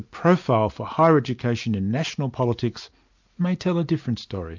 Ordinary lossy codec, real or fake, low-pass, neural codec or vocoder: MP3, 64 kbps; real; 7.2 kHz; none